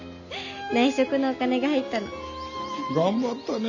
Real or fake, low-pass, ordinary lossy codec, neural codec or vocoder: real; 7.2 kHz; none; none